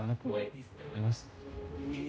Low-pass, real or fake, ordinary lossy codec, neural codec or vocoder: none; fake; none; codec, 16 kHz, 0.5 kbps, X-Codec, HuBERT features, trained on general audio